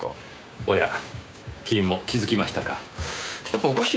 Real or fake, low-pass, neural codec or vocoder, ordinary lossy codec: fake; none; codec, 16 kHz, 6 kbps, DAC; none